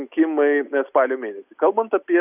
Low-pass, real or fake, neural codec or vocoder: 3.6 kHz; real; none